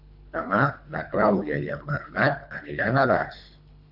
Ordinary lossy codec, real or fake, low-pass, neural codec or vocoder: AAC, 48 kbps; fake; 5.4 kHz; codec, 24 kHz, 3 kbps, HILCodec